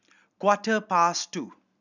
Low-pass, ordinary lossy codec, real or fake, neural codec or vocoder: 7.2 kHz; none; real; none